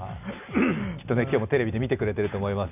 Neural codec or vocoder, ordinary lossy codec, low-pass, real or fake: none; none; 3.6 kHz; real